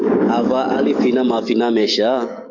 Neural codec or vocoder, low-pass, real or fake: codec, 44.1 kHz, 7.8 kbps, DAC; 7.2 kHz; fake